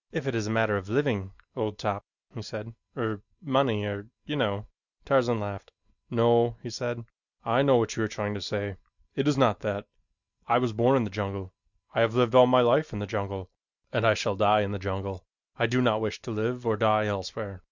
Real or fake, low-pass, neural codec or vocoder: real; 7.2 kHz; none